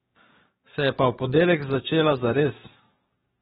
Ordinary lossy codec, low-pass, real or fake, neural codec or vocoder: AAC, 16 kbps; 19.8 kHz; fake; codec, 44.1 kHz, 7.8 kbps, DAC